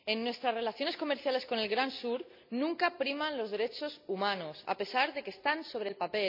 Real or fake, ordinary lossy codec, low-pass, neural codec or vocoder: real; MP3, 48 kbps; 5.4 kHz; none